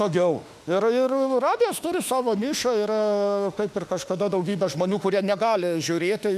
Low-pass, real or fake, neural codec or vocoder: 14.4 kHz; fake; autoencoder, 48 kHz, 32 numbers a frame, DAC-VAE, trained on Japanese speech